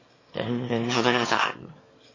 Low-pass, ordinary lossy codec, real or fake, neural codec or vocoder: 7.2 kHz; MP3, 32 kbps; fake; autoencoder, 22.05 kHz, a latent of 192 numbers a frame, VITS, trained on one speaker